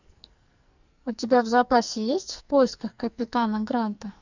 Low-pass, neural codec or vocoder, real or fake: 7.2 kHz; codec, 44.1 kHz, 2.6 kbps, SNAC; fake